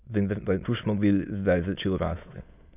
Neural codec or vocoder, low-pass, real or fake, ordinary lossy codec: autoencoder, 22.05 kHz, a latent of 192 numbers a frame, VITS, trained on many speakers; 3.6 kHz; fake; none